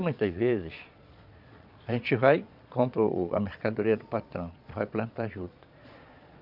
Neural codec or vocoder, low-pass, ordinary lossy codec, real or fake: vocoder, 22.05 kHz, 80 mel bands, Vocos; 5.4 kHz; none; fake